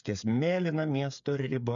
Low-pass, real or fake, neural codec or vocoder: 7.2 kHz; fake; codec, 16 kHz, 8 kbps, FreqCodec, smaller model